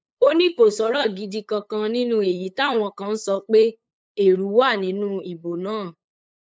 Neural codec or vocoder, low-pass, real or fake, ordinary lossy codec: codec, 16 kHz, 8 kbps, FunCodec, trained on LibriTTS, 25 frames a second; none; fake; none